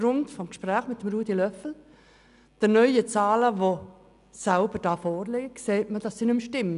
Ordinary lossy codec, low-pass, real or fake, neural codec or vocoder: none; 10.8 kHz; real; none